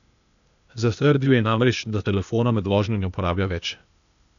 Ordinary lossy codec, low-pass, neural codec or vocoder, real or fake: none; 7.2 kHz; codec, 16 kHz, 0.8 kbps, ZipCodec; fake